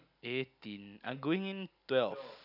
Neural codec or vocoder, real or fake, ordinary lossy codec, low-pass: none; real; none; 5.4 kHz